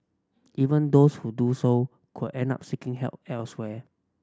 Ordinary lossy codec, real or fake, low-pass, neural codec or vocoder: none; real; none; none